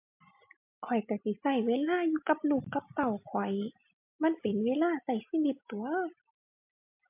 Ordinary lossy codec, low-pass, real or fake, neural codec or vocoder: MP3, 32 kbps; 3.6 kHz; real; none